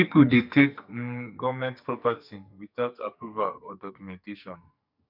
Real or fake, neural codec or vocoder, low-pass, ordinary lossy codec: fake; codec, 44.1 kHz, 2.6 kbps, SNAC; 5.4 kHz; none